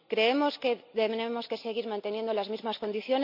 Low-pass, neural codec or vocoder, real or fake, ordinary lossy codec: 5.4 kHz; none; real; none